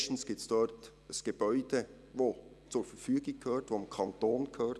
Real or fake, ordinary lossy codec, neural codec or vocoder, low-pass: real; none; none; none